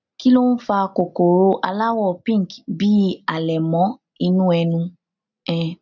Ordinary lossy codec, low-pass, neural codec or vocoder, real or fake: none; 7.2 kHz; none; real